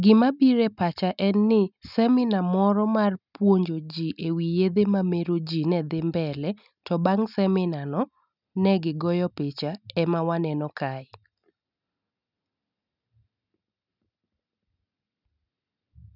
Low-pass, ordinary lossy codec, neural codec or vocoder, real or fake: 5.4 kHz; none; none; real